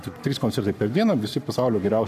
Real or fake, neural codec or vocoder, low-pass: fake; codec, 44.1 kHz, 7.8 kbps, Pupu-Codec; 14.4 kHz